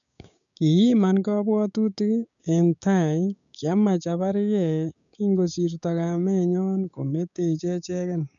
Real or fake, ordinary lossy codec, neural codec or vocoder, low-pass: fake; none; codec, 16 kHz, 6 kbps, DAC; 7.2 kHz